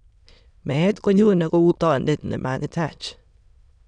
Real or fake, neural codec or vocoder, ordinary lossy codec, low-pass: fake; autoencoder, 22.05 kHz, a latent of 192 numbers a frame, VITS, trained on many speakers; none; 9.9 kHz